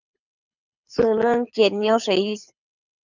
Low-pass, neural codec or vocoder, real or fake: 7.2 kHz; codec, 24 kHz, 6 kbps, HILCodec; fake